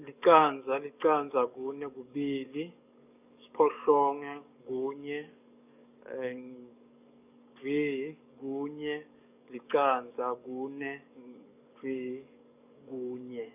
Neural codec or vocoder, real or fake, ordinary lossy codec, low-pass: codec, 44.1 kHz, 7.8 kbps, DAC; fake; none; 3.6 kHz